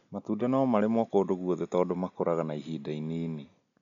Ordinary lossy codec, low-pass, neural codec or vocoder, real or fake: none; 7.2 kHz; none; real